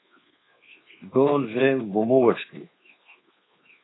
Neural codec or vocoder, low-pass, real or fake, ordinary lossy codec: codec, 24 kHz, 1.2 kbps, DualCodec; 7.2 kHz; fake; AAC, 16 kbps